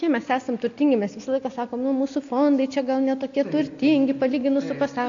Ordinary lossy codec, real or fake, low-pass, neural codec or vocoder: AAC, 48 kbps; real; 7.2 kHz; none